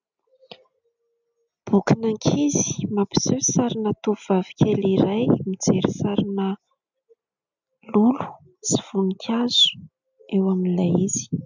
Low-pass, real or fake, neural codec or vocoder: 7.2 kHz; real; none